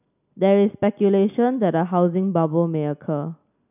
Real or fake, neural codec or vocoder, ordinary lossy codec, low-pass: real; none; none; 3.6 kHz